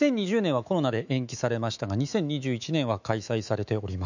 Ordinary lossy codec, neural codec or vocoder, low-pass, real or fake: none; autoencoder, 48 kHz, 128 numbers a frame, DAC-VAE, trained on Japanese speech; 7.2 kHz; fake